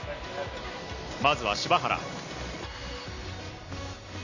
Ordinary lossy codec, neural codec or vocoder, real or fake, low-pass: none; none; real; 7.2 kHz